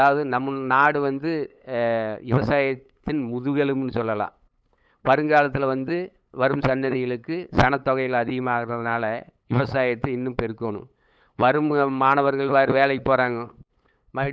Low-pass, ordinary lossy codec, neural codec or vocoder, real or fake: none; none; codec, 16 kHz, 8 kbps, FunCodec, trained on LibriTTS, 25 frames a second; fake